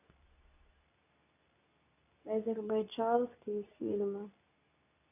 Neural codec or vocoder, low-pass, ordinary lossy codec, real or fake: codec, 24 kHz, 0.9 kbps, WavTokenizer, medium speech release version 2; 3.6 kHz; none; fake